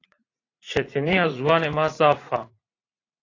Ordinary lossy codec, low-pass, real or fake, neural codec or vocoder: AAC, 32 kbps; 7.2 kHz; fake; vocoder, 44.1 kHz, 80 mel bands, Vocos